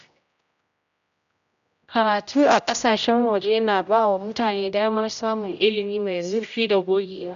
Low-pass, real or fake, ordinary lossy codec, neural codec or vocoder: 7.2 kHz; fake; none; codec, 16 kHz, 0.5 kbps, X-Codec, HuBERT features, trained on general audio